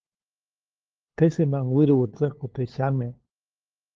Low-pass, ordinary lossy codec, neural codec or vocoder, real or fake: 7.2 kHz; Opus, 16 kbps; codec, 16 kHz, 2 kbps, FunCodec, trained on LibriTTS, 25 frames a second; fake